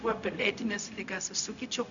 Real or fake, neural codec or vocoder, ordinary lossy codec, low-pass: fake; codec, 16 kHz, 0.4 kbps, LongCat-Audio-Codec; MP3, 96 kbps; 7.2 kHz